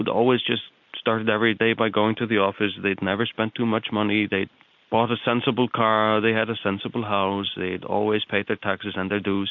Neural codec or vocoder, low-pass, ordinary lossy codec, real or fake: none; 7.2 kHz; MP3, 48 kbps; real